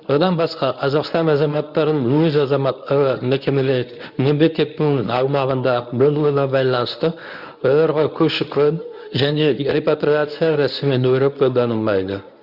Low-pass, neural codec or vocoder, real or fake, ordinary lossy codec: 5.4 kHz; codec, 24 kHz, 0.9 kbps, WavTokenizer, medium speech release version 1; fake; none